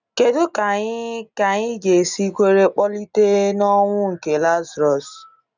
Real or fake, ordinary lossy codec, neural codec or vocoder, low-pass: real; none; none; 7.2 kHz